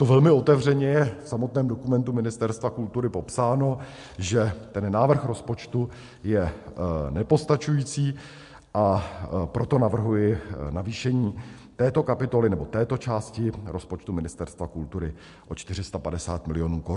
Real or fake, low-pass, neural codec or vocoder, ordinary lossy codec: real; 10.8 kHz; none; MP3, 64 kbps